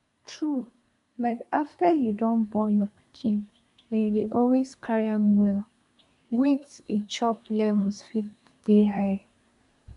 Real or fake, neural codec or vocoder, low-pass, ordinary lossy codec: fake; codec, 24 kHz, 1 kbps, SNAC; 10.8 kHz; MP3, 96 kbps